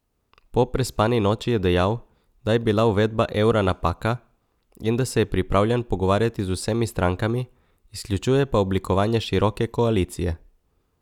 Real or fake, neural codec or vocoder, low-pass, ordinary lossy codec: real; none; 19.8 kHz; none